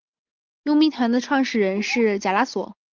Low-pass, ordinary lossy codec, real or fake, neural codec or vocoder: 7.2 kHz; Opus, 24 kbps; real; none